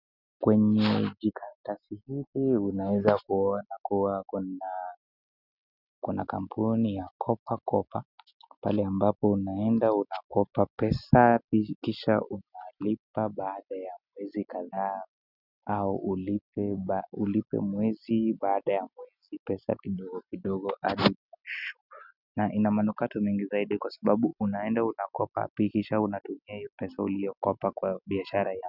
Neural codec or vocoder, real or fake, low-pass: none; real; 5.4 kHz